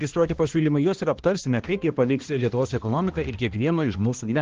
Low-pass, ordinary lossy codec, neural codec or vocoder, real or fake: 7.2 kHz; Opus, 16 kbps; codec, 16 kHz, 1 kbps, X-Codec, HuBERT features, trained on balanced general audio; fake